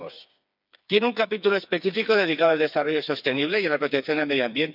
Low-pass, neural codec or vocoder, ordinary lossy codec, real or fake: 5.4 kHz; codec, 16 kHz, 4 kbps, FreqCodec, smaller model; none; fake